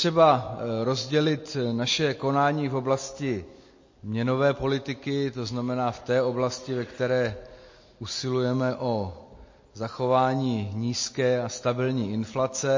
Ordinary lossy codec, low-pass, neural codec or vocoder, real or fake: MP3, 32 kbps; 7.2 kHz; none; real